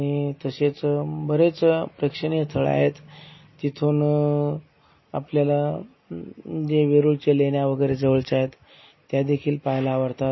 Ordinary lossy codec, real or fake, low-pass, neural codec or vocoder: MP3, 24 kbps; real; 7.2 kHz; none